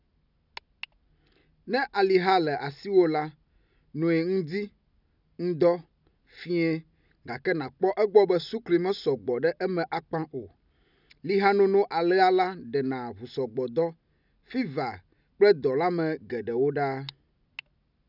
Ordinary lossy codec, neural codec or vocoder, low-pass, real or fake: none; none; 5.4 kHz; real